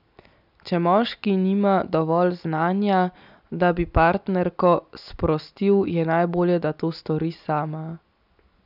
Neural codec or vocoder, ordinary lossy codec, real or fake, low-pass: none; none; real; 5.4 kHz